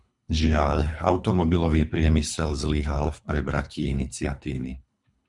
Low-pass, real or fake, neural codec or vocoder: 10.8 kHz; fake; codec, 24 kHz, 3 kbps, HILCodec